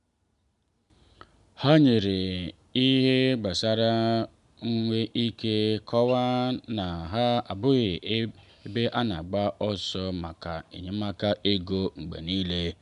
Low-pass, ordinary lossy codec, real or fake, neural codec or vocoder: 10.8 kHz; none; real; none